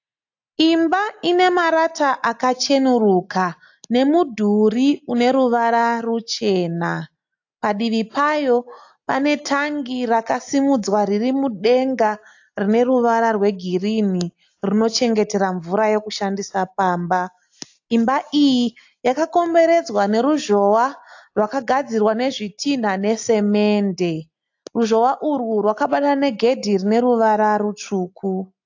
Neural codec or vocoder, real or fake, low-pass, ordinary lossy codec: none; real; 7.2 kHz; AAC, 48 kbps